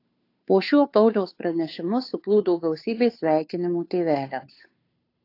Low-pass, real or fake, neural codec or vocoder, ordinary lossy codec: 5.4 kHz; fake; codec, 16 kHz, 2 kbps, FunCodec, trained on Chinese and English, 25 frames a second; AAC, 32 kbps